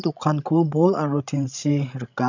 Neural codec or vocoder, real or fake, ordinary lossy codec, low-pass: codec, 16 kHz, 16 kbps, FreqCodec, larger model; fake; none; 7.2 kHz